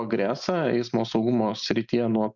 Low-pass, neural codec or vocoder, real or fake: 7.2 kHz; none; real